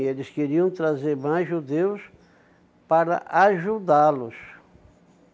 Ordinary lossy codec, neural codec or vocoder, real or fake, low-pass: none; none; real; none